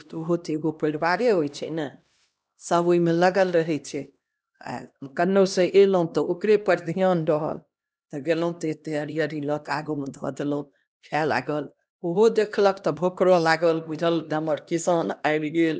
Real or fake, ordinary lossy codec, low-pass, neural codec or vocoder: fake; none; none; codec, 16 kHz, 1 kbps, X-Codec, HuBERT features, trained on LibriSpeech